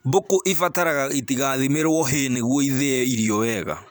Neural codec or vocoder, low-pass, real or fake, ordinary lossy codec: vocoder, 44.1 kHz, 128 mel bands every 512 samples, BigVGAN v2; none; fake; none